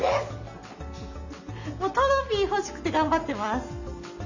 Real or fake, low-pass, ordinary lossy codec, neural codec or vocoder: real; 7.2 kHz; none; none